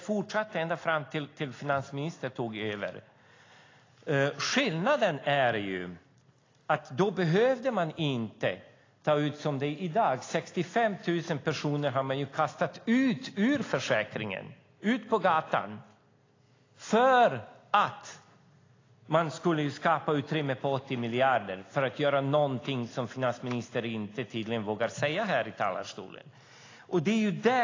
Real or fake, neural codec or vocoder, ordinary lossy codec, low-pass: real; none; AAC, 32 kbps; 7.2 kHz